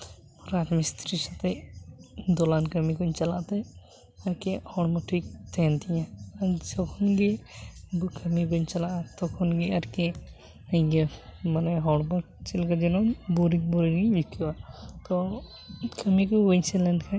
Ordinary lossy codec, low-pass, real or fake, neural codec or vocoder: none; none; real; none